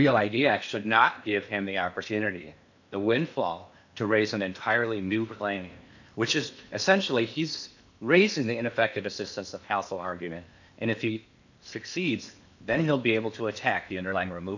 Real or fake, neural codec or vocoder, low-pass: fake; codec, 16 kHz in and 24 kHz out, 0.8 kbps, FocalCodec, streaming, 65536 codes; 7.2 kHz